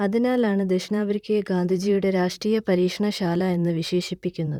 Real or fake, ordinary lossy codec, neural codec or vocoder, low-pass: fake; none; vocoder, 44.1 kHz, 128 mel bands, Pupu-Vocoder; 19.8 kHz